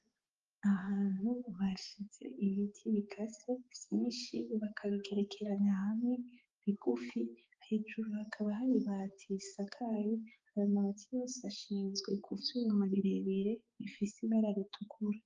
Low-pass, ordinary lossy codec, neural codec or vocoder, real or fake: 7.2 kHz; Opus, 32 kbps; codec, 16 kHz, 4 kbps, X-Codec, HuBERT features, trained on balanced general audio; fake